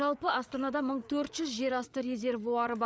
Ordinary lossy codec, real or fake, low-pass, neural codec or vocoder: none; fake; none; codec, 16 kHz, 8 kbps, FreqCodec, larger model